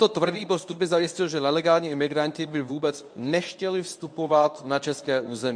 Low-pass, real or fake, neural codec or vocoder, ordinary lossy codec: 9.9 kHz; fake; codec, 24 kHz, 0.9 kbps, WavTokenizer, medium speech release version 1; MP3, 64 kbps